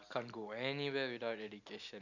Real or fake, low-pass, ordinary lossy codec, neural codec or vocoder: real; 7.2 kHz; none; none